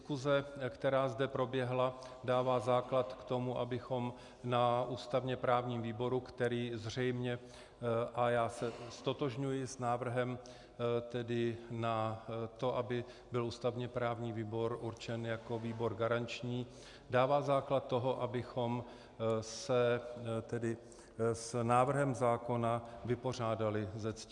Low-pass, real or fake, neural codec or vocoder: 10.8 kHz; real; none